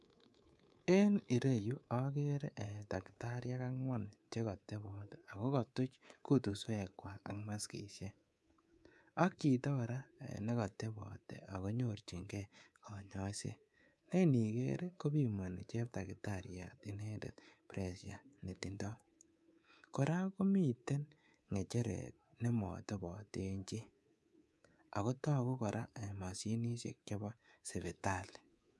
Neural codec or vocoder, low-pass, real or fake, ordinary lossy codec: codec, 24 kHz, 3.1 kbps, DualCodec; none; fake; none